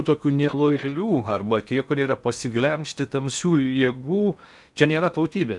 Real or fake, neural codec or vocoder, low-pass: fake; codec, 16 kHz in and 24 kHz out, 0.8 kbps, FocalCodec, streaming, 65536 codes; 10.8 kHz